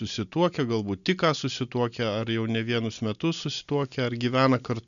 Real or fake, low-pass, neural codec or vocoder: real; 7.2 kHz; none